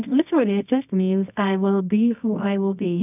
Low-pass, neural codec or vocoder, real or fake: 3.6 kHz; codec, 24 kHz, 0.9 kbps, WavTokenizer, medium music audio release; fake